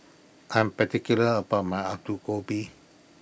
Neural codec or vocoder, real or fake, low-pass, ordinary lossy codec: none; real; none; none